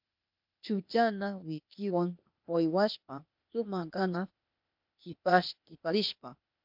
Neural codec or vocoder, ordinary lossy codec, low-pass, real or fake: codec, 16 kHz, 0.8 kbps, ZipCodec; MP3, 48 kbps; 5.4 kHz; fake